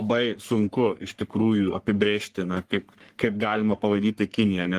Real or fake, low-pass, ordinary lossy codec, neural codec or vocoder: fake; 14.4 kHz; Opus, 24 kbps; codec, 44.1 kHz, 3.4 kbps, Pupu-Codec